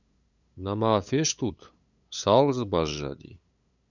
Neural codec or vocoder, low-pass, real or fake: codec, 16 kHz, 8 kbps, FunCodec, trained on LibriTTS, 25 frames a second; 7.2 kHz; fake